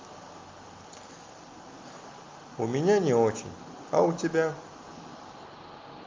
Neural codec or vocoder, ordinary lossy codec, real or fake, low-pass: none; Opus, 24 kbps; real; 7.2 kHz